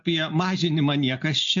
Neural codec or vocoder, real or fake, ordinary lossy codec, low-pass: none; real; AAC, 64 kbps; 7.2 kHz